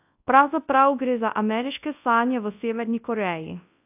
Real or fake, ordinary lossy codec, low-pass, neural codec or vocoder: fake; AAC, 32 kbps; 3.6 kHz; codec, 24 kHz, 0.9 kbps, WavTokenizer, large speech release